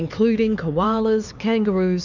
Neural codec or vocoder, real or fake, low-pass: codec, 16 kHz, 4 kbps, X-Codec, HuBERT features, trained on LibriSpeech; fake; 7.2 kHz